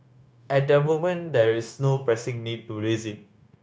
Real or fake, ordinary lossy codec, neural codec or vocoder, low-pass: fake; none; codec, 16 kHz, 0.9 kbps, LongCat-Audio-Codec; none